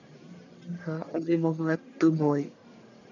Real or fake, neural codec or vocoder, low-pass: fake; codec, 44.1 kHz, 1.7 kbps, Pupu-Codec; 7.2 kHz